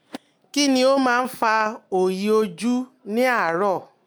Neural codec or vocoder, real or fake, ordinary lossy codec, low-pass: none; real; none; none